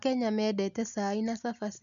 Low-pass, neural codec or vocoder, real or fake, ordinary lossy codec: 7.2 kHz; none; real; none